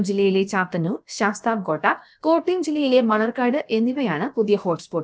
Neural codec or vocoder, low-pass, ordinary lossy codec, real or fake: codec, 16 kHz, about 1 kbps, DyCAST, with the encoder's durations; none; none; fake